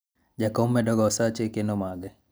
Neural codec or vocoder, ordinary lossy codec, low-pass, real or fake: none; none; none; real